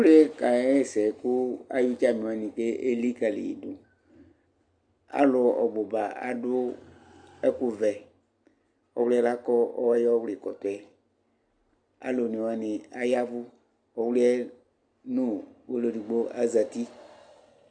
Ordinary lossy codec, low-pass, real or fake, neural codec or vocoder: AAC, 64 kbps; 9.9 kHz; real; none